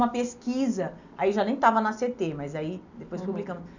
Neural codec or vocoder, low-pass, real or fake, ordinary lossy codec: none; 7.2 kHz; real; none